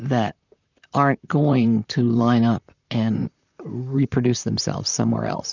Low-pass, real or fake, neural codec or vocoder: 7.2 kHz; fake; vocoder, 44.1 kHz, 128 mel bands, Pupu-Vocoder